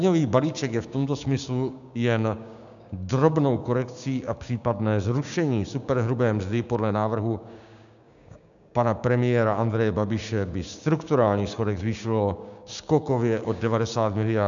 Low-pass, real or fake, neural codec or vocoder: 7.2 kHz; fake; codec, 16 kHz, 6 kbps, DAC